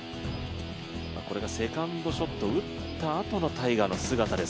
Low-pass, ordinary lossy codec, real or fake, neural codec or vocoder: none; none; real; none